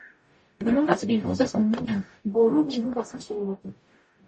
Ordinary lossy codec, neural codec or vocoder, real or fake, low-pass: MP3, 32 kbps; codec, 44.1 kHz, 0.9 kbps, DAC; fake; 10.8 kHz